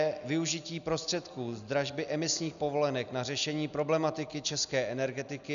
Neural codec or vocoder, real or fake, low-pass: none; real; 7.2 kHz